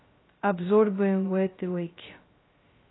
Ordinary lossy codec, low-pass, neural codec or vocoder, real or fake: AAC, 16 kbps; 7.2 kHz; codec, 16 kHz, 0.2 kbps, FocalCodec; fake